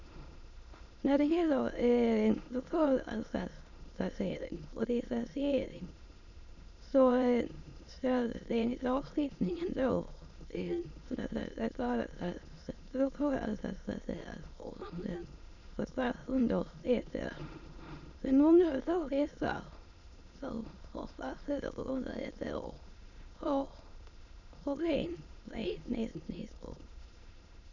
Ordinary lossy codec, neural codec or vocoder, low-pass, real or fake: none; autoencoder, 22.05 kHz, a latent of 192 numbers a frame, VITS, trained on many speakers; 7.2 kHz; fake